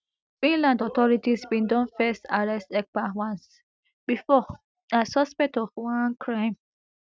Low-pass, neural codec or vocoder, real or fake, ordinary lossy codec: none; none; real; none